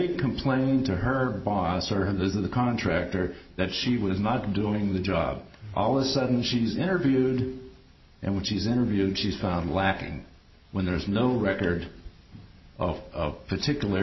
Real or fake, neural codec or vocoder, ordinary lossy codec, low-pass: real; none; MP3, 24 kbps; 7.2 kHz